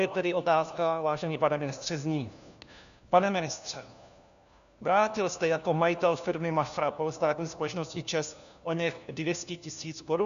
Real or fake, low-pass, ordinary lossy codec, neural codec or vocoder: fake; 7.2 kHz; MP3, 96 kbps; codec, 16 kHz, 1 kbps, FunCodec, trained on LibriTTS, 50 frames a second